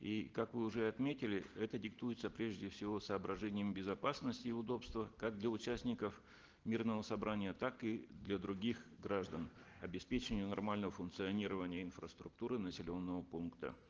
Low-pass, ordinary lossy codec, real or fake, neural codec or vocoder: 7.2 kHz; Opus, 16 kbps; real; none